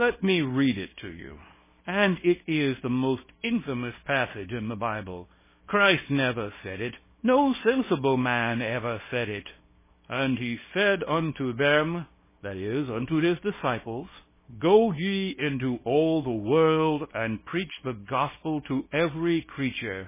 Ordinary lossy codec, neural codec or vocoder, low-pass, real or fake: MP3, 16 kbps; codec, 24 kHz, 0.9 kbps, WavTokenizer, small release; 3.6 kHz; fake